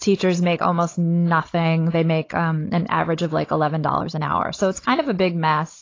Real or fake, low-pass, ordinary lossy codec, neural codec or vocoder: fake; 7.2 kHz; AAC, 32 kbps; codec, 16 kHz, 16 kbps, FunCodec, trained on Chinese and English, 50 frames a second